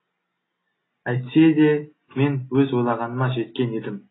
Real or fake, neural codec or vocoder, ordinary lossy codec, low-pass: real; none; AAC, 16 kbps; 7.2 kHz